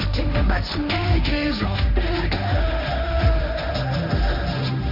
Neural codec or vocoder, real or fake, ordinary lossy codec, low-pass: codec, 16 kHz, 1.1 kbps, Voila-Tokenizer; fake; none; 5.4 kHz